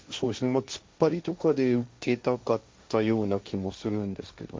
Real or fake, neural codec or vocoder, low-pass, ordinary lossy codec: fake; codec, 16 kHz, 1.1 kbps, Voila-Tokenizer; none; none